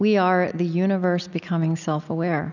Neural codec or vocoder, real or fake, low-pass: none; real; 7.2 kHz